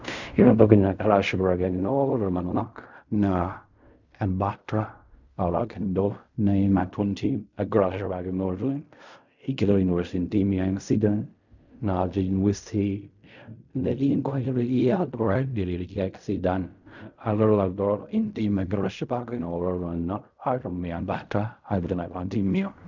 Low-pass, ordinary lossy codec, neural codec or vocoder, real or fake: 7.2 kHz; none; codec, 16 kHz in and 24 kHz out, 0.4 kbps, LongCat-Audio-Codec, fine tuned four codebook decoder; fake